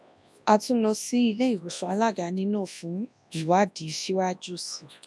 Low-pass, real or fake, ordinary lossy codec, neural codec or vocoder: none; fake; none; codec, 24 kHz, 0.9 kbps, WavTokenizer, large speech release